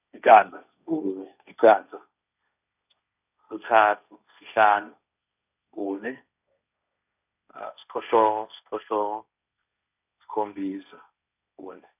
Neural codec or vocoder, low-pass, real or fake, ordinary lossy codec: codec, 16 kHz, 1.1 kbps, Voila-Tokenizer; 3.6 kHz; fake; none